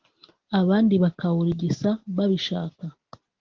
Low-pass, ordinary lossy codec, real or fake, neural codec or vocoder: 7.2 kHz; Opus, 16 kbps; real; none